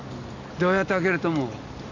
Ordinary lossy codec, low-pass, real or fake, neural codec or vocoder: AAC, 48 kbps; 7.2 kHz; real; none